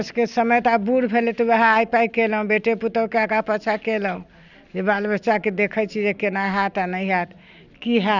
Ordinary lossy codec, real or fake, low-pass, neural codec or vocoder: none; real; 7.2 kHz; none